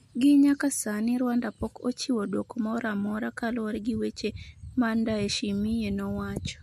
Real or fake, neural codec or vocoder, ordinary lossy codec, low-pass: real; none; MP3, 64 kbps; 14.4 kHz